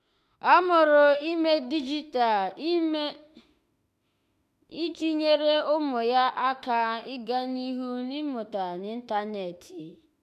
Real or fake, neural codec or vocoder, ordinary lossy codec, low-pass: fake; autoencoder, 48 kHz, 32 numbers a frame, DAC-VAE, trained on Japanese speech; none; 14.4 kHz